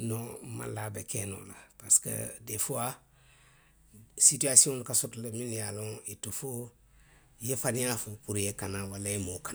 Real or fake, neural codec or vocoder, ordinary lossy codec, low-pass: real; none; none; none